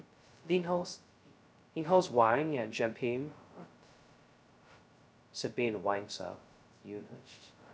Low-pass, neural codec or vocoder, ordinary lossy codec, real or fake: none; codec, 16 kHz, 0.2 kbps, FocalCodec; none; fake